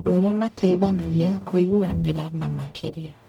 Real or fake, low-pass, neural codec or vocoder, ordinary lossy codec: fake; 19.8 kHz; codec, 44.1 kHz, 0.9 kbps, DAC; MP3, 96 kbps